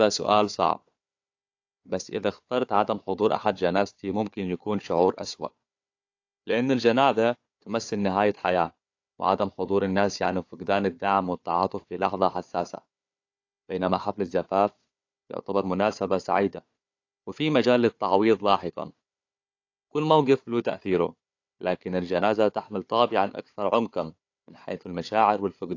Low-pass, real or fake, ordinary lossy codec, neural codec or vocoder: 7.2 kHz; fake; AAC, 48 kbps; codec, 16 kHz, 4 kbps, FunCodec, trained on Chinese and English, 50 frames a second